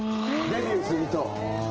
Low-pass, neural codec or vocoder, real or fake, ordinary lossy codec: 7.2 kHz; none; real; Opus, 16 kbps